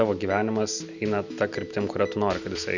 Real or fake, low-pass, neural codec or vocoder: real; 7.2 kHz; none